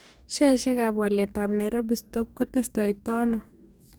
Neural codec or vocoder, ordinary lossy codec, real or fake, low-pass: codec, 44.1 kHz, 2.6 kbps, DAC; none; fake; none